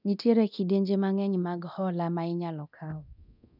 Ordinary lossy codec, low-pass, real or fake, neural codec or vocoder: none; 5.4 kHz; fake; codec, 24 kHz, 0.9 kbps, DualCodec